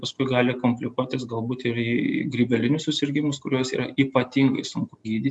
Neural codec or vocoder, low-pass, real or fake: none; 10.8 kHz; real